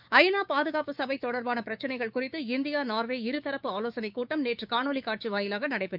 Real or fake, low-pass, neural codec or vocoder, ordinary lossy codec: fake; 5.4 kHz; codec, 16 kHz, 6 kbps, DAC; none